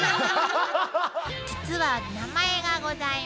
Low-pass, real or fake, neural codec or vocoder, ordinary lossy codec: none; real; none; none